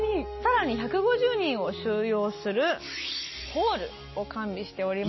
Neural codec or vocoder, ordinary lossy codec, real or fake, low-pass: none; MP3, 24 kbps; real; 7.2 kHz